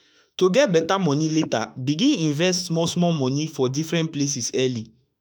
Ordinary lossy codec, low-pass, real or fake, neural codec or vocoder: none; none; fake; autoencoder, 48 kHz, 32 numbers a frame, DAC-VAE, trained on Japanese speech